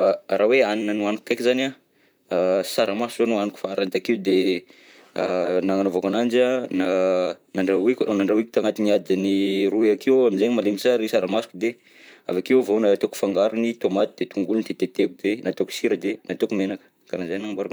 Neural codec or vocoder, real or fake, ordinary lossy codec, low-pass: vocoder, 44.1 kHz, 128 mel bands, Pupu-Vocoder; fake; none; none